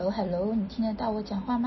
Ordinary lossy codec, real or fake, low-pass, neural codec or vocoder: MP3, 24 kbps; real; 7.2 kHz; none